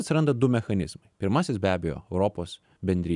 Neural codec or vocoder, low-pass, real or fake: none; 10.8 kHz; real